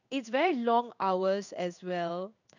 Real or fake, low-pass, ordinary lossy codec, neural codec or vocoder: fake; 7.2 kHz; none; codec, 16 kHz in and 24 kHz out, 1 kbps, XY-Tokenizer